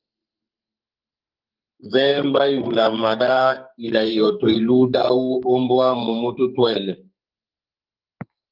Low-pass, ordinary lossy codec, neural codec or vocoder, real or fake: 5.4 kHz; Opus, 24 kbps; codec, 44.1 kHz, 2.6 kbps, SNAC; fake